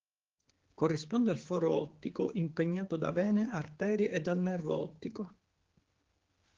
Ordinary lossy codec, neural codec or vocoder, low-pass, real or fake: Opus, 16 kbps; codec, 16 kHz, 4 kbps, X-Codec, HuBERT features, trained on general audio; 7.2 kHz; fake